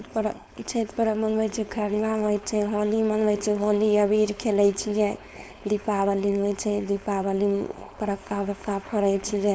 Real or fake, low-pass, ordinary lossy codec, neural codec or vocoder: fake; none; none; codec, 16 kHz, 4.8 kbps, FACodec